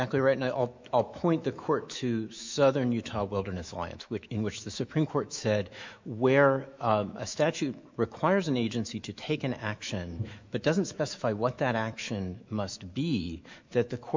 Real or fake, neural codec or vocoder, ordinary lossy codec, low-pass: fake; autoencoder, 48 kHz, 128 numbers a frame, DAC-VAE, trained on Japanese speech; MP3, 64 kbps; 7.2 kHz